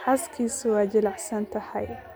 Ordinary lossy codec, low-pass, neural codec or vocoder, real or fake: none; none; none; real